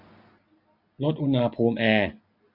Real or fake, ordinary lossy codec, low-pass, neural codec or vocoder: real; none; 5.4 kHz; none